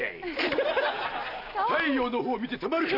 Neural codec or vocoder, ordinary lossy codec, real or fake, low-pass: none; AAC, 32 kbps; real; 5.4 kHz